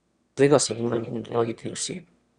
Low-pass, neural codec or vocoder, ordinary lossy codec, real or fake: 9.9 kHz; autoencoder, 22.05 kHz, a latent of 192 numbers a frame, VITS, trained on one speaker; Opus, 64 kbps; fake